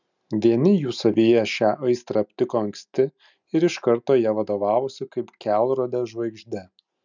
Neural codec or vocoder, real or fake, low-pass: none; real; 7.2 kHz